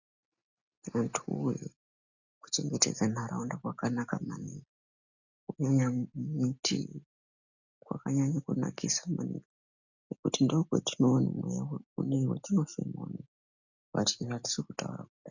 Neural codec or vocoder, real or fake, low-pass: none; real; 7.2 kHz